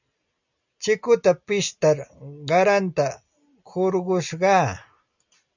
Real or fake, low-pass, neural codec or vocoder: real; 7.2 kHz; none